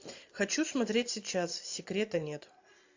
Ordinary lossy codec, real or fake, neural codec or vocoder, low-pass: AAC, 48 kbps; fake; vocoder, 44.1 kHz, 128 mel bands every 512 samples, BigVGAN v2; 7.2 kHz